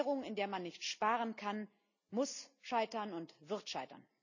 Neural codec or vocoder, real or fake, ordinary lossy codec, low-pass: none; real; none; 7.2 kHz